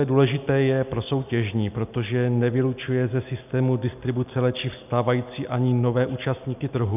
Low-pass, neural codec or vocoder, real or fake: 3.6 kHz; none; real